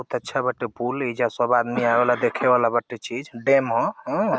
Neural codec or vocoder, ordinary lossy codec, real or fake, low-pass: none; none; real; none